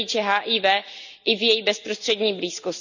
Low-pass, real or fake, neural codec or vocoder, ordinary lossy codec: 7.2 kHz; real; none; none